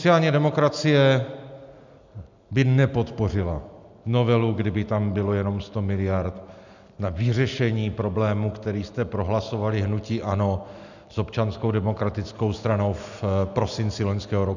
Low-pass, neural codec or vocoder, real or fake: 7.2 kHz; none; real